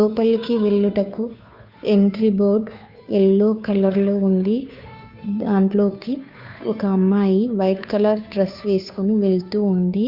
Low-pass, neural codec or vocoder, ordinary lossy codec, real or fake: 5.4 kHz; codec, 16 kHz, 2 kbps, FunCodec, trained on Chinese and English, 25 frames a second; Opus, 64 kbps; fake